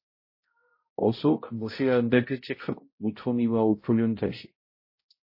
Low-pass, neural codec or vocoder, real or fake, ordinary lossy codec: 5.4 kHz; codec, 16 kHz, 0.5 kbps, X-Codec, HuBERT features, trained on balanced general audio; fake; MP3, 24 kbps